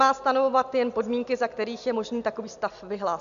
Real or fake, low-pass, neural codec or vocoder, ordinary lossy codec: real; 7.2 kHz; none; MP3, 96 kbps